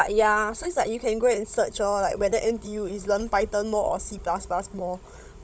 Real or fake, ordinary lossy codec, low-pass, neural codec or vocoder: fake; none; none; codec, 16 kHz, 16 kbps, FunCodec, trained on Chinese and English, 50 frames a second